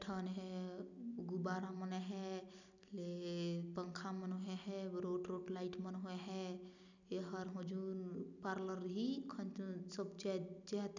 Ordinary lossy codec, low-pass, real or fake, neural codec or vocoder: none; 7.2 kHz; real; none